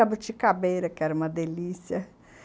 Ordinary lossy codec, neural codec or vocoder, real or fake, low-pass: none; none; real; none